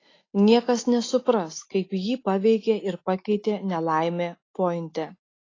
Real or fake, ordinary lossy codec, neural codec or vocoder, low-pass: real; AAC, 32 kbps; none; 7.2 kHz